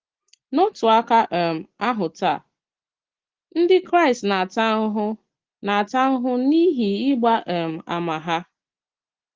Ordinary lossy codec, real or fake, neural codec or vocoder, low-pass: Opus, 16 kbps; real; none; 7.2 kHz